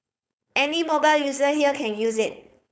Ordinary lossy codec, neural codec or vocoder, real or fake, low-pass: none; codec, 16 kHz, 4.8 kbps, FACodec; fake; none